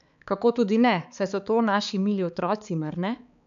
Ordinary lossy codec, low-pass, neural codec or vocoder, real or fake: none; 7.2 kHz; codec, 16 kHz, 4 kbps, X-Codec, HuBERT features, trained on balanced general audio; fake